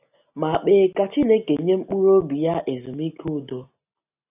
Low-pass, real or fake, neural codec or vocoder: 3.6 kHz; real; none